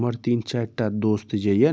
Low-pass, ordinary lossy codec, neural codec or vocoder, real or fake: none; none; none; real